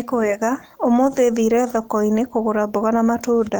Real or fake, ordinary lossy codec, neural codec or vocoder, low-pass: real; Opus, 24 kbps; none; 19.8 kHz